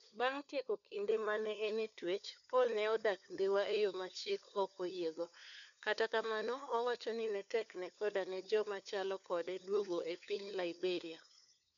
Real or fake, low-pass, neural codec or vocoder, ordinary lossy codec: fake; 7.2 kHz; codec, 16 kHz, 4 kbps, FunCodec, trained on LibriTTS, 50 frames a second; none